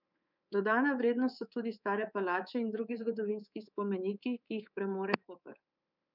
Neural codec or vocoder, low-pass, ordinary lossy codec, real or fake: codec, 24 kHz, 3.1 kbps, DualCodec; 5.4 kHz; none; fake